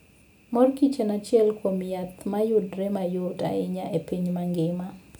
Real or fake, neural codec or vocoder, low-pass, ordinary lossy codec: real; none; none; none